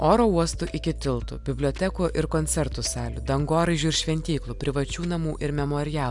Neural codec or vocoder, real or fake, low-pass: none; real; 10.8 kHz